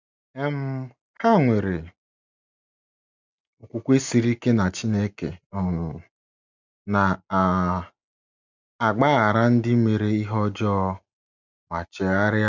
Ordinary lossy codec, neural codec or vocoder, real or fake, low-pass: none; none; real; 7.2 kHz